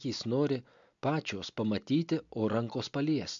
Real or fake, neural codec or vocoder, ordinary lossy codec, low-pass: real; none; MP3, 64 kbps; 7.2 kHz